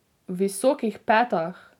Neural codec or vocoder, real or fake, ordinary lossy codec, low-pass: none; real; none; 19.8 kHz